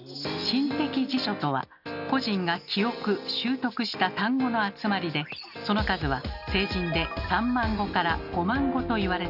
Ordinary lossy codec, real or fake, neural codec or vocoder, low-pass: none; real; none; 5.4 kHz